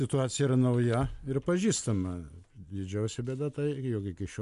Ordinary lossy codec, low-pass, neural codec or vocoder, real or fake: MP3, 64 kbps; 10.8 kHz; none; real